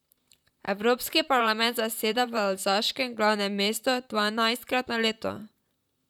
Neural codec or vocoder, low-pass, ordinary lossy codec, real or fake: vocoder, 44.1 kHz, 128 mel bands, Pupu-Vocoder; 19.8 kHz; none; fake